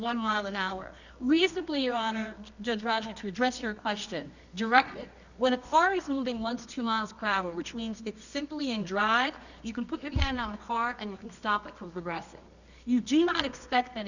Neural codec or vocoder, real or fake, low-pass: codec, 24 kHz, 0.9 kbps, WavTokenizer, medium music audio release; fake; 7.2 kHz